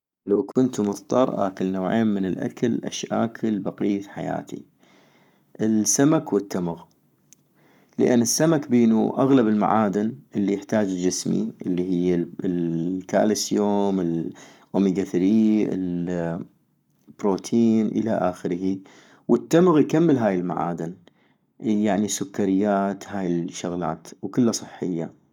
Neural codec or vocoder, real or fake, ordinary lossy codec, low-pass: codec, 44.1 kHz, 7.8 kbps, Pupu-Codec; fake; none; 19.8 kHz